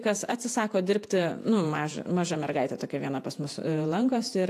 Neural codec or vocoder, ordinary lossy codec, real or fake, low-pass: vocoder, 48 kHz, 128 mel bands, Vocos; AAC, 64 kbps; fake; 14.4 kHz